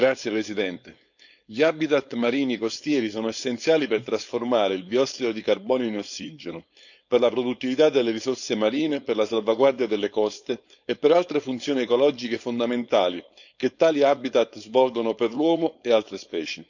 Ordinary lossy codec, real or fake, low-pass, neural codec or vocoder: none; fake; 7.2 kHz; codec, 16 kHz, 4.8 kbps, FACodec